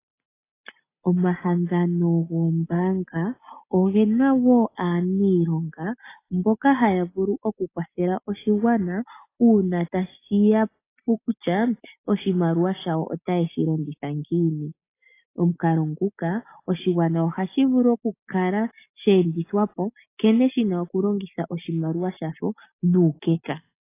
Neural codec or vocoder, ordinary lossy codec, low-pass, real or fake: none; AAC, 24 kbps; 3.6 kHz; real